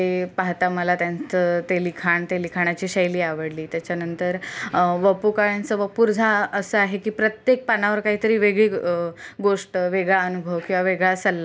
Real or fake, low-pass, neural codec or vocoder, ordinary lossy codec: real; none; none; none